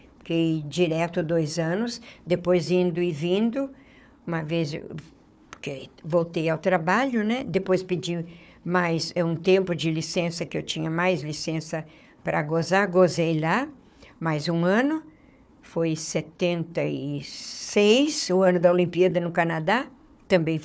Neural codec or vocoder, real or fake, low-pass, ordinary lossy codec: codec, 16 kHz, 4 kbps, FunCodec, trained on Chinese and English, 50 frames a second; fake; none; none